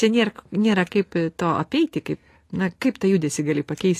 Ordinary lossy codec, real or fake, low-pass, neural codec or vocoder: AAC, 48 kbps; real; 14.4 kHz; none